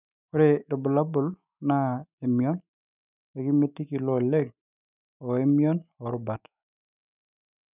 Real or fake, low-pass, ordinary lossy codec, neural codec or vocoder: fake; 3.6 kHz; none; autoencoder, 48 kHz, 128 numbers a frame, DAC-VAE, trained on Japanese speech